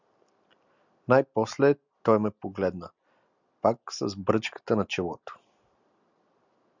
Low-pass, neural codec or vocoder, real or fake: 7.2 kHz; none; real